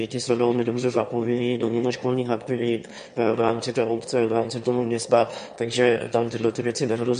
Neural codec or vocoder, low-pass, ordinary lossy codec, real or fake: autoencoder, 22.05 kHz, a latent of 192 numbers a frame, VITS, trained on one speaker; 9.9 kHz; MP3, 48 kbps; fake